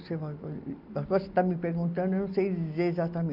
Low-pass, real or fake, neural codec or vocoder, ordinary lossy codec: 5.4 kHz; real; none; none